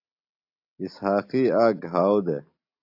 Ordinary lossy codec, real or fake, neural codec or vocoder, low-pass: AAC, 48 kbps; real; none; 5.4 kHz